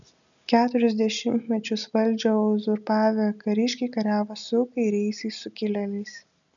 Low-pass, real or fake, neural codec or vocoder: 7.2 kHz; real; none